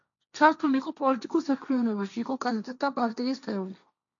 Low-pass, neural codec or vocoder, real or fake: 7.2 kHz; codec, 16 kHz, 1.1 kbps, Voila-Tokenizer; fake